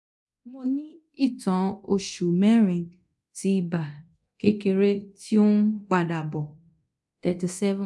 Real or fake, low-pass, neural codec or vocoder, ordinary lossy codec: fake; none; codec, 24 kHz, 0.9 kbps, DualCodec; none